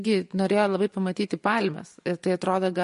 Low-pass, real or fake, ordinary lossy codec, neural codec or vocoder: 10.8 kHz; fake; MP3, 48 kbps; vocoder, 24 kHz, 100 mel bands, Vocos